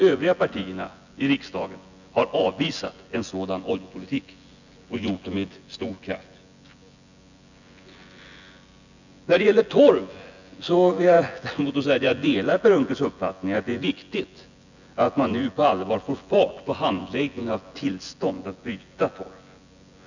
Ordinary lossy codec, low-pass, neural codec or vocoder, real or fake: none; 7.2 kHz; vocoder, 24 kHz, 100 mel bands, Vocos; fake